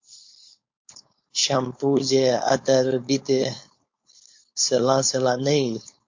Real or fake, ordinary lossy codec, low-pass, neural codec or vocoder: fake; MP3, 48 kbps; 7.2 kHz; codec, 16 kHz, 4.8 kbps, FACodec